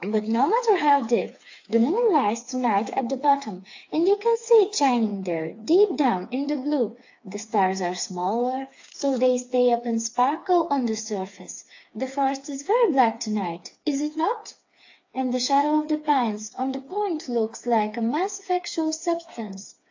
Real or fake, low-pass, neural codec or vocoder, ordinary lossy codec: fake; 7.2 kHz; codec, 16 kHz, 4 kbps, FreqCodec, smaller model; AAC, 48 kbps